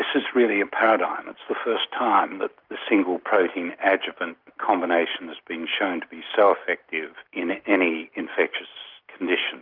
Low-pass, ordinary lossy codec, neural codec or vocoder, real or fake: 5.4 kHz; Opus, 24 kbps; none; real